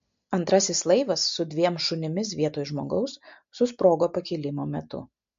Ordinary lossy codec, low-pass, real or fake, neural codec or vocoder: MP3, 48 kbps; 7.2 kHz; real; none